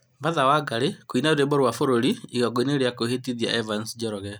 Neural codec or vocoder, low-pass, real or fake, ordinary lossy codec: none; none; real; none